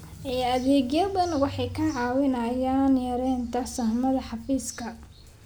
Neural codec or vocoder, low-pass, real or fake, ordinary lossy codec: none; none; real; none